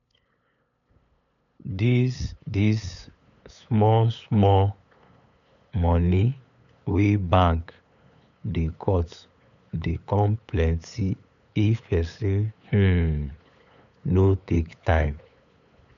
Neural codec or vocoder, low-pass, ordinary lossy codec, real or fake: codec, 16 kHz, 8 kbps, FunCodec, trained on LibriTTS, 25 frames a second; 7.2 kHz; none; fake